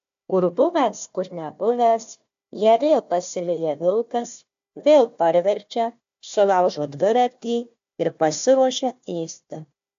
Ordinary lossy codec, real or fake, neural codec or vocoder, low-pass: AAC, 64 kbps; fake; codec, 16 kHz, 1 kbps, FunCodec, trained on Chinese and English, 50 frames a second; 7.2 kHz